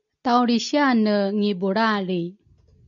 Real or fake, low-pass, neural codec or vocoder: real; 7.2 kHz; none